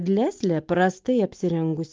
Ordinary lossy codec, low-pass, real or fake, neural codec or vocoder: Opus, 32 kbps; 7.2 kHz; real; none